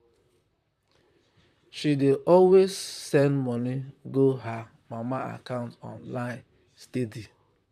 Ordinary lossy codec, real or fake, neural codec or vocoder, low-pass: none; fake; vocoder, 44.1 kHz, 128 mel bands, Pupu-Vocoder; 14.4 kHz